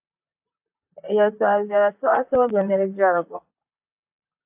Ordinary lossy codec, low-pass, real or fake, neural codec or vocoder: AAC, 32 kbps; 3.6 kHz; fake; vocoder, 44.1 kHz, 128 mel bands, Pupu-Vocoder